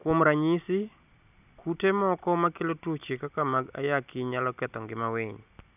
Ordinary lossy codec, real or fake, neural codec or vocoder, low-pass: none; real; none; 3.6 kHz